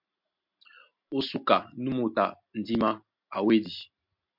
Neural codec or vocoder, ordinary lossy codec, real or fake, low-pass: none; MP3, 48 kbps; real; 5.4 kHz